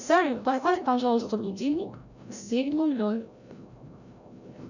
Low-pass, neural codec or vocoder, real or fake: 7.2 kHz; codec, 16 kHz, 0.5 kbps, FreqCodec, larger model; fake